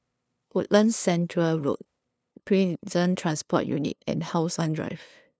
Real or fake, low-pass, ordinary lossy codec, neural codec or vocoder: fake; none; none; codec, 16 kHz, 2 kbps, FunCodec, trained on LibriTTS, 25 frames a second